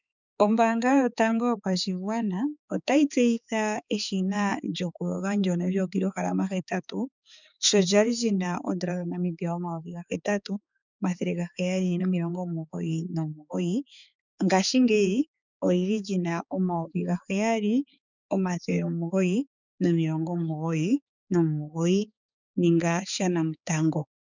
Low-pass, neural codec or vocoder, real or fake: 7.2 kHz; codec, 16 kHz, 4 kbps, X-Codec, HuBERT features, trained on balanced general audio; fake